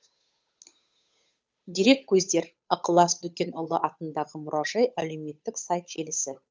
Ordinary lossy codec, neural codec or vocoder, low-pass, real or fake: none; codec, 16 kHz, 8 kbps, FunCodec, trained on Chinese and English, 25 frames a second; none; fake